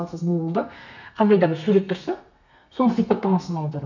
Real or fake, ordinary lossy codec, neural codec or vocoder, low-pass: fake; none; codec, 32 kHz, 1.9 kbps, SNAC; 7.2 kHz